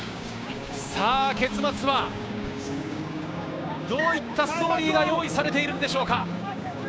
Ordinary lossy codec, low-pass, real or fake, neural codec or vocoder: none; none; fake; codec, 16 kHz, 6 kbps, DAC